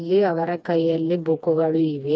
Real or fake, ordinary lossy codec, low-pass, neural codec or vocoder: fake; none; none; codec, 16 kHz, 2 kbps, FreqCodec, smaller model